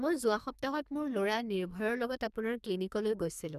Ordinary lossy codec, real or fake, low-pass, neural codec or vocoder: none; fake; 14.4 kHz; codec, 44.1 kHz, 2.6 kbps, SNAC